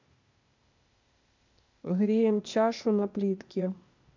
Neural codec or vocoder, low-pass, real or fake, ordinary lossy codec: codec, 16 kHz, 0.8 kbps, ZipCodec; 7.2 kHz; fake; MP3, 64 kbps